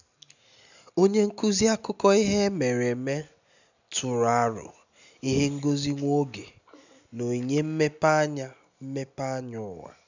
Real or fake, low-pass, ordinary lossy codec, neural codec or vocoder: real; 7.2 kHz; none; none